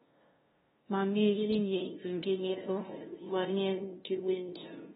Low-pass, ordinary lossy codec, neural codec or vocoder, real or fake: 7.2 kHz; AAC, 16 kbps; codec, 16 kHz, 0.5 kbps, FunCodec, trained on LibriTTS, 25 frames a second; fake